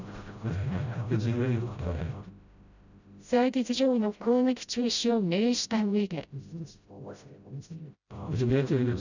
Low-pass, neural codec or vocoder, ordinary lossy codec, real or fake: 7.2 kHz; codec, 16 kHz, 0.5 kbps, FreqCodec, smaller model; none; fake